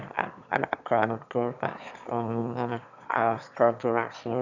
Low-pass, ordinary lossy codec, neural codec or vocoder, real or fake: 7.2 kHz; none; autoencoder, 22.05 kHz, a latent of 192 numbers a frame, VITS, trained on one speaker; fake